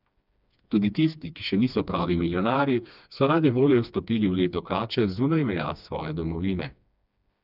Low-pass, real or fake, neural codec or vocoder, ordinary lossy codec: 5.4 kHz; fake; codec, 16 kHz, 2 kbps, FreqCodec, smaller model; none